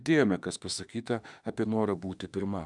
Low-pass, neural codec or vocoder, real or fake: 10.8 kHz; autoencoder, 48 kHz, 32 numbers a frame, DAC-VAE, trained on Japanese speech; fake